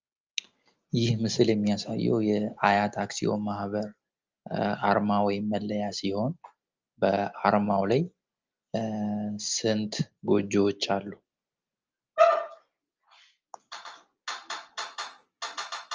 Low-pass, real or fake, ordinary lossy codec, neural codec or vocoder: 7.2 kHz; real; Opus, 24 kbps; none